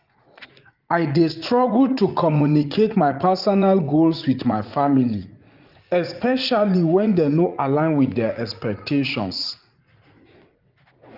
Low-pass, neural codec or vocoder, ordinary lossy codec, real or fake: 5.4 kHz; vocoder, 44.1 kHz, 80 mel bands, Vocos; Opus, 24 kbps; fake